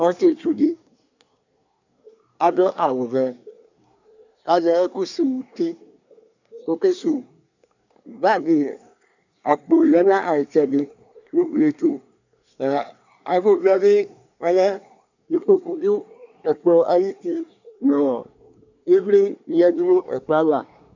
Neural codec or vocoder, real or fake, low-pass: codec, 24 kHz, 1 kbps, SNAC; fake; 7.2 kHz